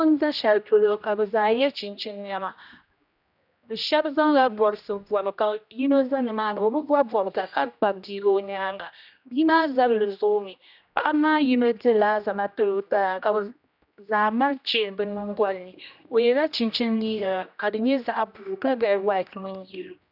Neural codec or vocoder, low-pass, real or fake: codec, 16 kHz, 1 kbps, X-Codec, HuBERT features, trained on general audio; 5.4 kHz; fake